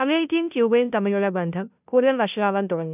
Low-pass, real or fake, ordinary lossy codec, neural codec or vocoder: 3.6 kHz; fake; none; codec, 16 kHz, 0.5 kbps, FunCodec, trained on Chinese and English, 25 frames a second